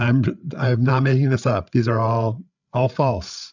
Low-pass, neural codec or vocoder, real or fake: 7.2 kHz; codec, 16 kHz, 8 kbps, FreqCodec, larger model; fake